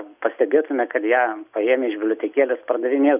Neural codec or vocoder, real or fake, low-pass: none; real; 3.6 kHz